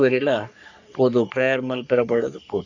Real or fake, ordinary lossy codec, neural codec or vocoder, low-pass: fake; none; codec, 44.1 kHz, 3.4 kbps, Pupu-Codec; 7.2 kHz